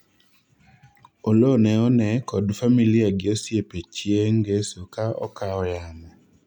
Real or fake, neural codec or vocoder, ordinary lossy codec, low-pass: real; none; none; 19.8 kHz